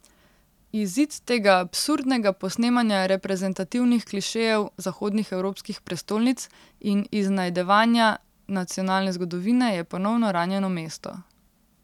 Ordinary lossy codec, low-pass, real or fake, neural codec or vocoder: none; 19.8 kHz; real; none